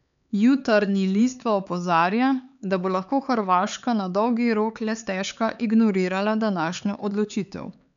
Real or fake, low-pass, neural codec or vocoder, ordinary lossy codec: fake; 7.2 kHz; codec, 16 kHz, 4 kbps, X-Codec, HuBERT features, trained on LibriSpeech; none